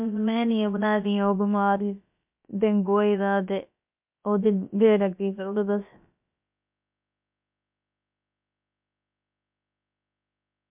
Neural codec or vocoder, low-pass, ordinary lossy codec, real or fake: codec, 16 kHz, about 1 kbps, DyCAST, with the encoder's durations; 3.6 kHz; MP3, 32 kbps; fake